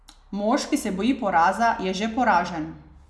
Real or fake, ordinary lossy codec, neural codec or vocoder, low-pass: real; none; none; none